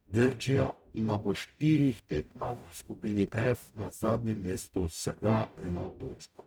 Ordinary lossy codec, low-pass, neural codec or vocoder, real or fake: none; none; codec, 44.1 kHz, 0.9 kbps, DAC; fake